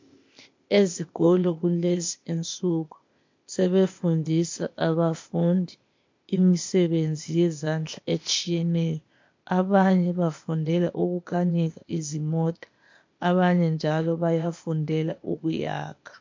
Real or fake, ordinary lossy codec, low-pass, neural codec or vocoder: fake; MP3, 48 kbps; 7.2 kHz; codec, 16 kHz, 0.8 kbps, ZipCodec